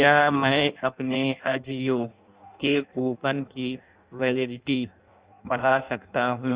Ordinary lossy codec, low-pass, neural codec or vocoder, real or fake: Opus, 64 kbps; 3.6 kHz; codec, 16 kHz in and 24 kHz out, 0.6 kbps, FireRedTTS-2 codec; fake